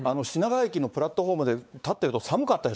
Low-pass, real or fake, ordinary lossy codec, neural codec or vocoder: none; fake; none; codec, 16 kHz, 4 kbps, X-Codec, WavLM features, trained on Multilingual LibriSpeech